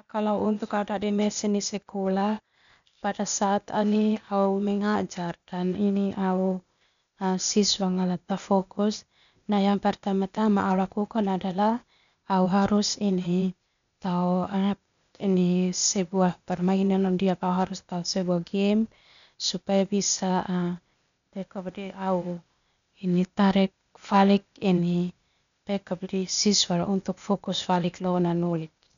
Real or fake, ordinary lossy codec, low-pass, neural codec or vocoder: fake; none; 7.2 kHz; codec, 16 kHz, 0.8 kbps, ZipCodec